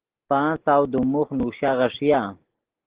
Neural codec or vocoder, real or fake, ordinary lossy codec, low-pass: none; real; Opus, 16 kbps; 3.6 kHz